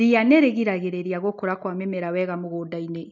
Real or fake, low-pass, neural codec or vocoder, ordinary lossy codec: real; 7.2 kHz; none; none